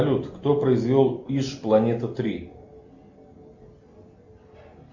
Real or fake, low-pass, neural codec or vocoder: real; 7.2 kHz; none